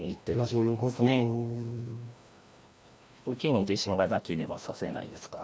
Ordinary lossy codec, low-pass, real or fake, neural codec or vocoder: none; none; fake; codec, 16 kHz, 1 kbps, FreqCodec, larger model